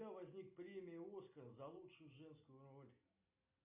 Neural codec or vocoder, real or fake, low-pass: none; real; 3.6 kHz